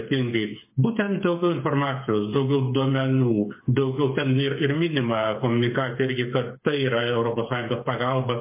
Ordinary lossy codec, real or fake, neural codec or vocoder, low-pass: MP3, 24 kbps; fake; codec, 16 kHz, 8 kbps, FreqCodec, smaller model; 3.6 kHz